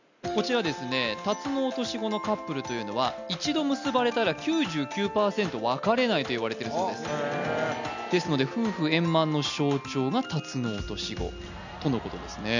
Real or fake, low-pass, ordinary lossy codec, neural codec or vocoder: real; 7.2 kHz; none; none